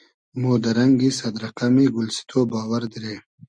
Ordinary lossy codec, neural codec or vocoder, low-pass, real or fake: Opus, 64 kbps; none; 9.9 kHz; real